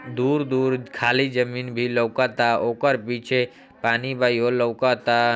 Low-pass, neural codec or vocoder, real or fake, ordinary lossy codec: none; none; real; none